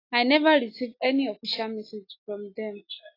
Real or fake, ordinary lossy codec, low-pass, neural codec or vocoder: real; AAC, 24 kbps; 5.4 kHz; none